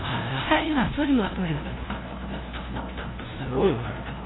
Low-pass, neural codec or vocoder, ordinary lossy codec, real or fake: 7.2 kHz; codec, 16 kHz, 0.5 kbps, FunCodec, trained on LibriTTS, 25 frames a second; AAC, 16 kbps; fake